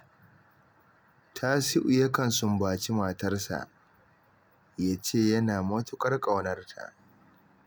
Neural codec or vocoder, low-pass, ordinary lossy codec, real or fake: none; none; none; real